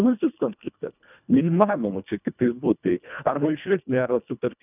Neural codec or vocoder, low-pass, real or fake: codec, 24 kHz, 1.5 kbps, HILCodec; 3.6 kHz; fake